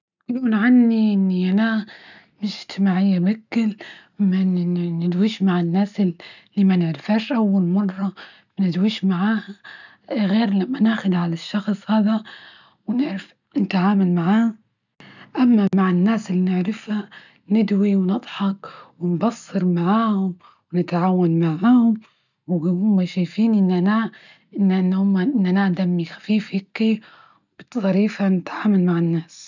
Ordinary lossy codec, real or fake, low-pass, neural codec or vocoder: none; real; 7.2 kHz; none